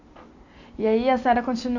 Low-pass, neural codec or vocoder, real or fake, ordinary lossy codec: 7.2 kHz; none; real; none